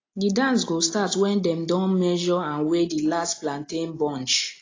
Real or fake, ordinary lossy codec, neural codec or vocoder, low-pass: real; AAC, 32 kbps; none; 7.2 kHz